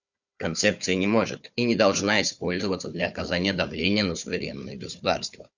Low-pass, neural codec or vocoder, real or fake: 7.2 kHz; codec, 16 kHz, 4 kbps, FunCodec, trained on Chinese and English, 50 frames a second; fake